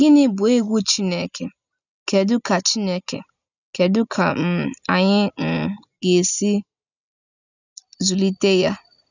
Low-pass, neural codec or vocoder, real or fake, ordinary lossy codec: 7.2 kHz; none; real; none